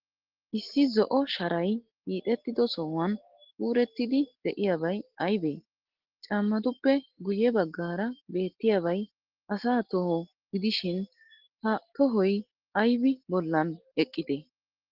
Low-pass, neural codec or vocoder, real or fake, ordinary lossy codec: 5.4 kHz; none; real; Opus, 16 kbps